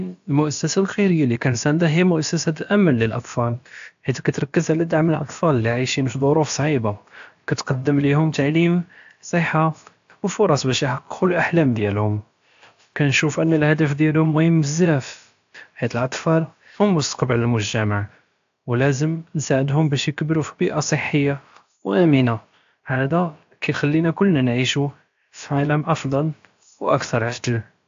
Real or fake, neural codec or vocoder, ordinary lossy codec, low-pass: fake; codec, 16 kHz, about 1 kbps, DyCAST, with the encoder's durations; AAC, 64 kbps; 7.2 kHz